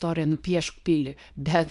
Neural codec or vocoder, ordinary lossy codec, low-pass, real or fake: codec, 24 kHz, 0.9 kbps, WavTokenizer, small release; MP3, 64 kbps; 10.8 kHz; fake